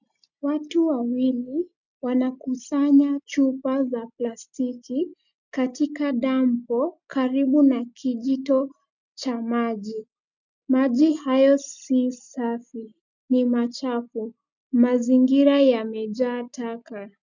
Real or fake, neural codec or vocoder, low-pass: real; none; 7.2 kHz